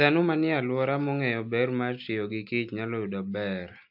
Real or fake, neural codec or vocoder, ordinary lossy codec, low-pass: real; none; AAC, 48 kbps; 5.4 kHz